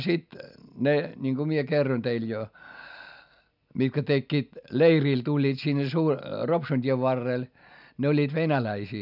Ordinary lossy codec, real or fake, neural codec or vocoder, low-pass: none; real; none; 5.4 kHz